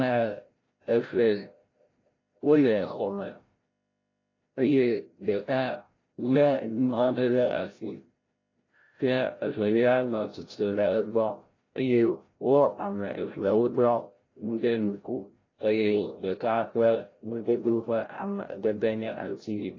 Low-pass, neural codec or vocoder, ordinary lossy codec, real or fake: 7.2 kHz; codec, 16 kHz, 0.5 kbps, FreqCodec, larger model; AAC, 32 kbps; fake